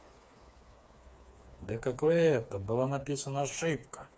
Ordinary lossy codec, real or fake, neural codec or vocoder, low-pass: none; fake; codec, 16 kHz, 4 kbps, FreqCodec, smaller model; none